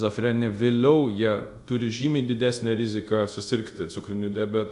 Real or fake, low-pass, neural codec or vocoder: fake; 10.8 kHz; codec, 24 kHz, 0.5 kbps, DualCodec